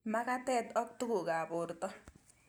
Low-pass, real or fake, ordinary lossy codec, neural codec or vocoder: none; real; none; none